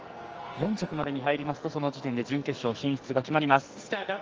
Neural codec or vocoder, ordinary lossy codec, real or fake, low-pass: codec, 44.1 kHz, 2.6 kbps, SNAC; Opus, 24 kbps; fake; 7.2 kHz